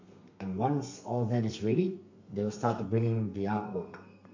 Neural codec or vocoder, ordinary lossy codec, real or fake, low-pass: codec, 32 kHz, 1.9 kbps, SNAC; MP3, 48 kbps; fake; 7.2 kHz